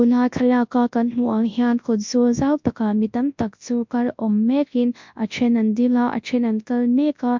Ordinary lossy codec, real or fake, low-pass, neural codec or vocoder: MP3, 64 kbps; fake; 7.2 kHz; codec, 24 kHz, 0.9 kbps, WavTokenizer, large speech release